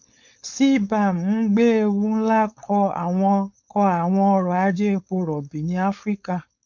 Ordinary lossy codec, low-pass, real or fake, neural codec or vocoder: MP3, 64 kbps; 7.2 kHz; fake; codec, 16 kHz, 4.8 kbps, FACodec